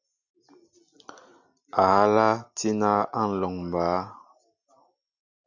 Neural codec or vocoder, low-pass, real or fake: none; 7.2 kHz; real